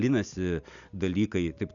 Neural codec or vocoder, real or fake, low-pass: none; real; 7.2 kHz